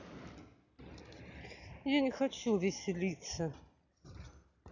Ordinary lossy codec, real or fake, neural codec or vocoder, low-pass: none; real; none; 7.2 kHz